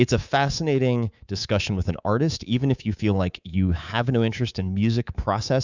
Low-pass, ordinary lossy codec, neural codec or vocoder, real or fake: 7.2 kHz; Opus, 64 kbps; codec, 24 kHz, 3.1 kbps, DualCodec; fake